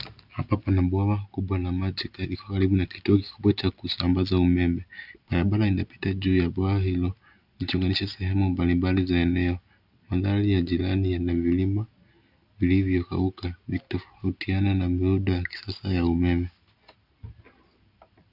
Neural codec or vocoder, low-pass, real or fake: none; 5.4 kHz; real